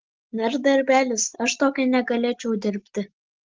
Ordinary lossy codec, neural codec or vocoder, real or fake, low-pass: Opus, 16 kbps; none; real; 7.2 kHz